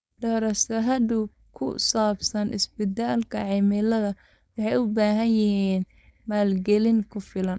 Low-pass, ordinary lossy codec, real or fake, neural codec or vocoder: none; none; fake; codec, 16 kHz, 4.8 kbps, FACodec